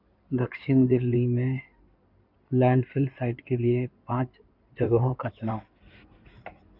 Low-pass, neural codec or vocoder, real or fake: 5.4 kHz; codec, 16 kHz in and 24 kHz out, 2.2 kbps, FireRedTTS-2 codec; fake